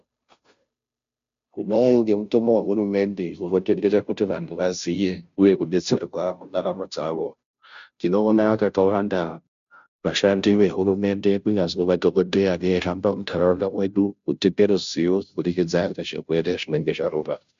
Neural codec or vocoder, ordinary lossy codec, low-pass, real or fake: codec, 16 kHz, 0.5 kbps, FunCodec, trained on Chinese and English, 25 frames a second; MP3, 64 kbps; 7.2 kHz; fake